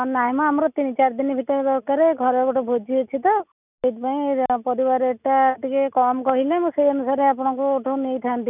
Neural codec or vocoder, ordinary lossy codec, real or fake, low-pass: none; none; real; 3.6 kHz